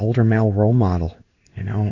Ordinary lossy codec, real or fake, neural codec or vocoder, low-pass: Opus, 64 kbps; fake; codec, 16 kHz in and 24 kHz out, 1 kbps, XY-Tokenizer; 7.2 kHz